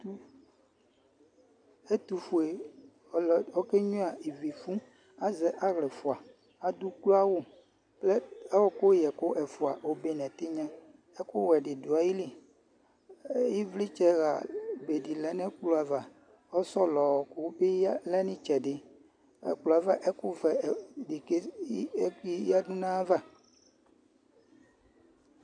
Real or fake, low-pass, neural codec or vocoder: real; 9.9 kHz; none